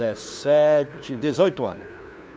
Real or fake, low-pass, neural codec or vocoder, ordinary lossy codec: fake; none; codec, 16 kHz, 2 kbps, FunCodec, trained on LibriTTS, 25 frames a second; none